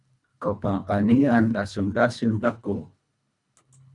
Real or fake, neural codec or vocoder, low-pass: fake; codec, 24 kHz, 1.5 kbps, HILCodec; 10.8 kHz